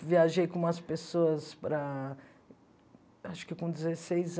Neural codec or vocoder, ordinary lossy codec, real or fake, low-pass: none; none; real; none